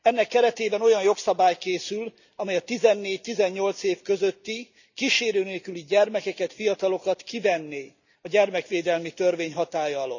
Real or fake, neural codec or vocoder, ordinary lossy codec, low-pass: real; none; none; 7.2 kHz